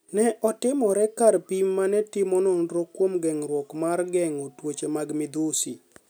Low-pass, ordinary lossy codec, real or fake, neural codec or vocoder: none; none; real; none